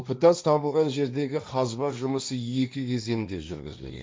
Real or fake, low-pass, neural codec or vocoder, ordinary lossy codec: fake; none; codec, 16 kHz, 1.1 kbps, Voila-Tokenizer; none